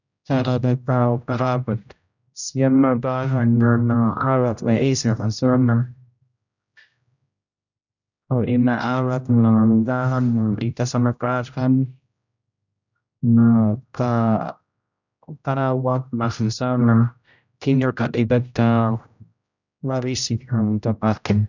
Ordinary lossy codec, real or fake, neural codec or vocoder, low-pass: none; fake; codec, 16 kHz, 0.5 kbps, X-Codec, HuBERT features, trained on general audio; 7.2 kHz